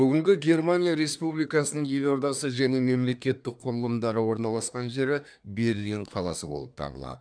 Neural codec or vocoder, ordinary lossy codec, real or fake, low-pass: codec, 24 kHz, 1 kbps, SNAC; none; fake; 9.9 kHz